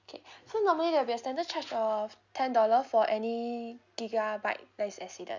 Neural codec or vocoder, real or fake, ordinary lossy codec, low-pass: none; real; none; 7.2 kHz